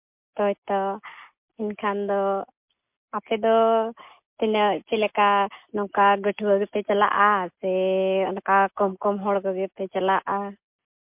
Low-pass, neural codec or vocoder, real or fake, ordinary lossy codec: 3.6 kHz; none; real; MP3, 32 kbps